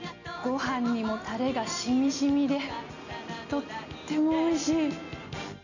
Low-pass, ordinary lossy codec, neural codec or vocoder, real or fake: 7.2 kHz; none; none; real